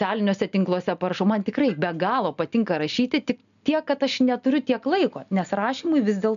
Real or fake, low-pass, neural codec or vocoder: real; 7.2 kHz; none